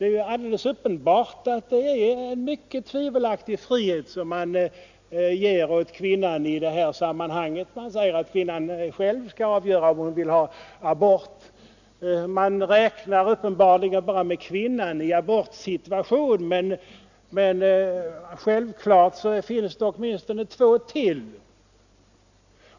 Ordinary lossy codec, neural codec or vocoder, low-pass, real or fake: none; none; 7.2 kHz; real